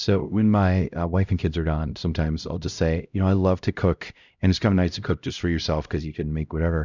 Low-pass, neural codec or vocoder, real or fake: 7.2 kHz; codec, 16 kHz, 0.5 kbps, X-Codec, HuBERT features, trained on LibriSpeech; fake